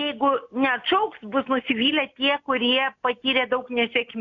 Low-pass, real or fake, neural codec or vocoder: 7.2 kHz; real; none